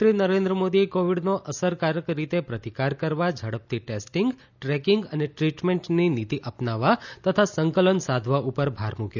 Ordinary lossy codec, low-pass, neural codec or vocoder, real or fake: none; 7.2 kHz; none; real